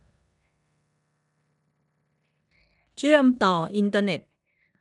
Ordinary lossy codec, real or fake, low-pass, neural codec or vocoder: none; fake; 10.8 kHz; codec, 16 kHz in and 24 kHz out, 0.9 kbps, LongCat-Audio-Codec, four codebook decoder